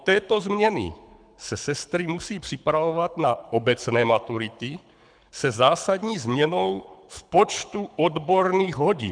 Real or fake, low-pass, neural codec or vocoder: fake; 9.9 kHz; codec, 24 kHz, 6 kbps, HILCodec